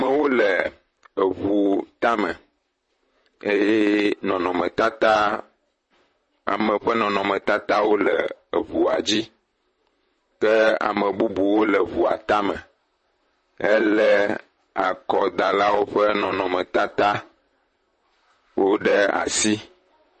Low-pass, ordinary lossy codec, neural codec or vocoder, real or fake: 10.8 kHz; MP3, 32 kbps; vocoder, 44.1 kHz, 128 mel bands, Pupu-Vocoder; fake